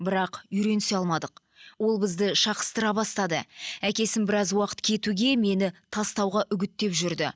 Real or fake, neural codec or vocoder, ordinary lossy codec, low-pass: real; none; none; none